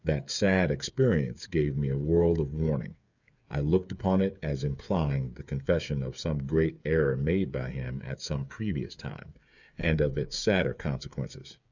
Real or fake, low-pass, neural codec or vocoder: fake; 7.2 kHz; codec, 16 kHz, 8 kbps, FreqCodec, smaller model